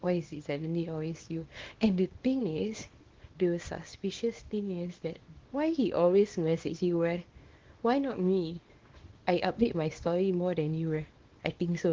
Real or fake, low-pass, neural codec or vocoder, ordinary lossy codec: fake; 7.2 kHz; codec, 24 kHz, 0.9 kbps, WavTokenizer, small release; Opus, 16 kbps